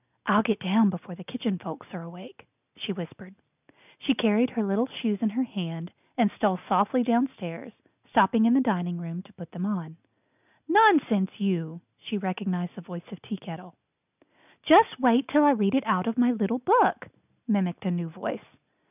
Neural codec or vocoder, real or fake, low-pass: none; real; 3.6 kHz